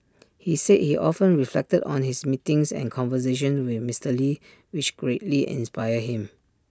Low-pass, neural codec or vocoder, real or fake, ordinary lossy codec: none; none; real; none